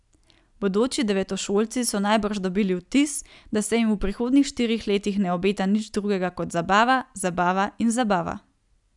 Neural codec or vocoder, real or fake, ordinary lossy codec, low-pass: none; real; none; 10.8 kHz